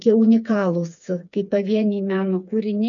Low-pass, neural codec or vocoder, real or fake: 7.2 kHz; codec, 16 kHz, 4 kbps, FreqCodec, smaller model; fake